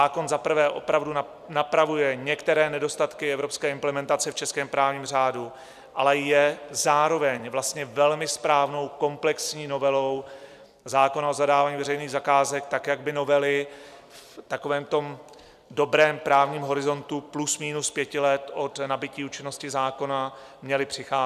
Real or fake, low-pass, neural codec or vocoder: fake; 14.4 kHz; vocoder, 44.1 kHz, 128 mel bands every 256 samples, BigVGAN v2